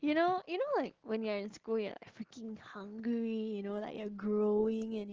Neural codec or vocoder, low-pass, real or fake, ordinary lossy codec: none; 7.2 kHz; real; Opus, 16 kbps